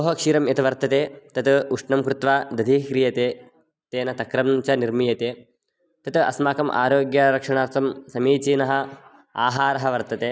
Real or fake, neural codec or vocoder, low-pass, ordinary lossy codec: real; none; none; none